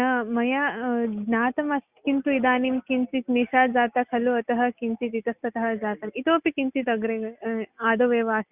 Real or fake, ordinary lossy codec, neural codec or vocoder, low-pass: real; Opus, 64 kbps; none; 3.6 kHz